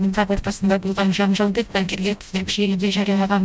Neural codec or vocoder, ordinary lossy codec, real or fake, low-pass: codec, 16 kHz, 0.5 kbps, FreqCodec, smaller model; none; fake; none